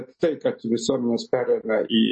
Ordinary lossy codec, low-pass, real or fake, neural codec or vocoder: MP3, 32 kbps; 10.8 kHz; real; none